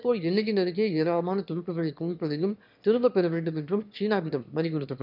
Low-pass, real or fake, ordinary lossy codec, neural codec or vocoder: 5.4 kHz; fake; none; autoencoder, 22.05 kHz, a latent of 192 numbers a frame, VITS, trained on one speaker